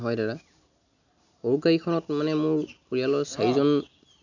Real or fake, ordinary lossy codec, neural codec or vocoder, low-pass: real; none; none; 7.2 kHz